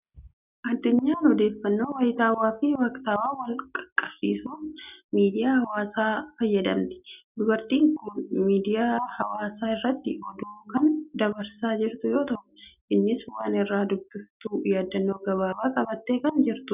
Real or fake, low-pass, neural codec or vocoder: real; 3.6 kHz; none